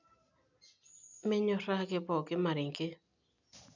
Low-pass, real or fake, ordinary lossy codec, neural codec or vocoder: 7.2 kHz; real; none; none